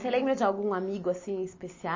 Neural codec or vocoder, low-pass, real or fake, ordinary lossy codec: none; 7.2 kHz; real; MP3, 32 kbps